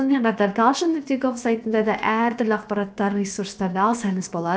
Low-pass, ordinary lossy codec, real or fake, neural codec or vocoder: none; none; fake; codec, 16 kHz, about 1 kbps, DyCAST, with the encoder's durations